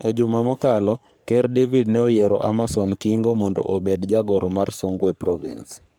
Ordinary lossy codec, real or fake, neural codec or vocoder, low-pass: none; fake; codec, 44.1 kHz, 3.4 kbps, Pupu-Codec; none